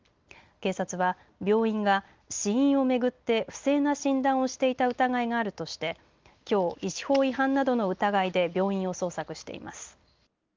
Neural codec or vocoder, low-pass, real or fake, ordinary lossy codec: none; 7.2 kHz; real; Opus, 32 kbps